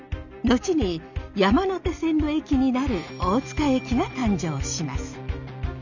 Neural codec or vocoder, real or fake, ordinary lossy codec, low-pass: none; real; none; 7.2 kHz